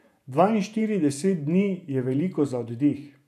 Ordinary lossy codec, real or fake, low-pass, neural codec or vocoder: none; fake; 14.4 kHz; vocoder, 48 kHz, 128 mel bands, Vocos